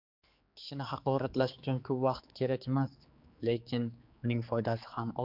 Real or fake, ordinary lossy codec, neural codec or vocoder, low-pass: fake; MP3, 48 kbps; codec, 16 kHz, 4 kbps, X-Codec, HuBERT features, trained on general audio; 5.4 kHz